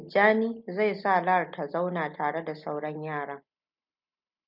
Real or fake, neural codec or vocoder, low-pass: real; none; 5.4 kHz